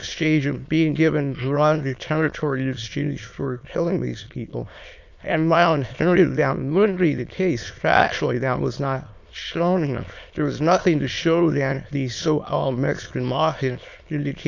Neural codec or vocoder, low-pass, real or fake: autoencoder, 22.05 kHz, a latent of 192 numbers a frame, VITS, trained on many speakers; 7.2 kHz; fake